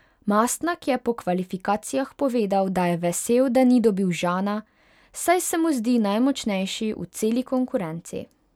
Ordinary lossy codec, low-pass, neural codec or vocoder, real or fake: none; 19.8 kHz; none; real